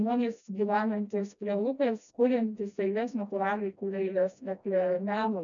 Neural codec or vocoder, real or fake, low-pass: codec, 16 kHz, 1 kbps, FreqCodec, smaller model; fake; 7.2 kHz